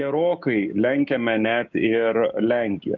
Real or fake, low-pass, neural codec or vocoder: fake; 7.2 kHz; codec, 16 kHz, 6 kbps, DAC